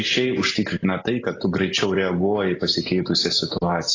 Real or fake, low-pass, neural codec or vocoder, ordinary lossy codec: real; 7.2 kHz; none; AAC, 32 kbps